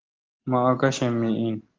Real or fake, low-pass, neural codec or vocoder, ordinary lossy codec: real; 7.2 kHz; none; Opus, 16 kbps